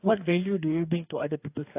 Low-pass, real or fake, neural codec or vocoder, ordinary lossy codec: 3.6 kHz; fake; codec, 44.1 kHz, 2.6 kbps, DAC; AAC, 24 kbps